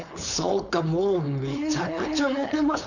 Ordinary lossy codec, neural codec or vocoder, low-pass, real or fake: none; codec, 16 kHz, 4.8 kbps, FACodec; 7.2 kHz; fake